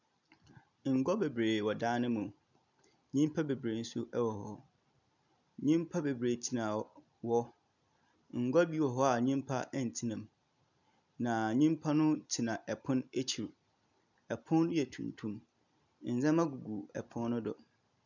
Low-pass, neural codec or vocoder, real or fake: 7.2 kHz; none; real